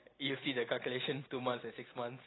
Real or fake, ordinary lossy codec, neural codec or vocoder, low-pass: real; AAC, 16 kbps; none; 7.2 kHz